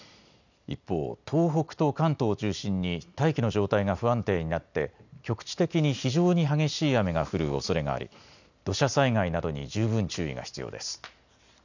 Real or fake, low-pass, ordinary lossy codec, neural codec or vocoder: real; 7.2 kHz; none; none